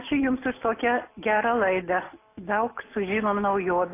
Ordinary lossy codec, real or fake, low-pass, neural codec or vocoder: AAC, 24 kbps; real; 3.6 kHz; none